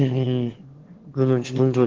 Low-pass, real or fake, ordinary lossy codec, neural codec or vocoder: 7.2 kHz; fake; Opus, 16 kbps; autoencoder, 22.05 kHz, a latent of 192 numbers a frame, VITS, trained on one speaker